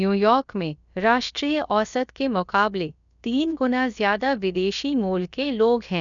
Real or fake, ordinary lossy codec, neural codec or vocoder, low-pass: fake; none; codec, 16 kHz, about 1 kbps, DyCAST, with the encoder's durations; 7.2 kHz